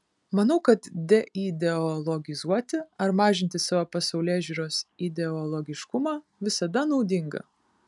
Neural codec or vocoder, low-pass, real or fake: none; 10.8 kHz; real